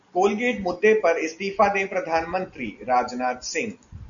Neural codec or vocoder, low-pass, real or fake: none; 7.2 kHz; real